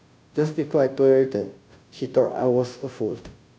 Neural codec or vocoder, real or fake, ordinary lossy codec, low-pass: codec, 16 kHz, 0.5 kbps, FunCodec, trained on Chinese and English, 25 frames a second; fake; none; none